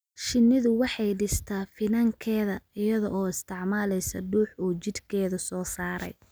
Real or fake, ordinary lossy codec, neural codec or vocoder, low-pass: real; none; none; none